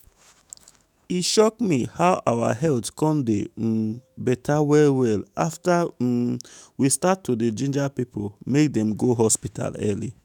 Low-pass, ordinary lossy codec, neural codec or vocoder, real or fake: none; none; autoencoder, 48 kHz, 128 numbers a frame, DAC-VAE, trained on Japanese speech; fake